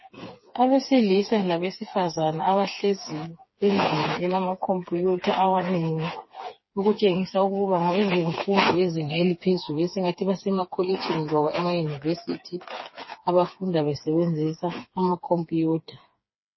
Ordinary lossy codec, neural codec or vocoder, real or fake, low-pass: MP3, 24 kbps; codec, 16 kHz, 4 kbps, FreqCodec, smaller model; fake; 7.2 kHz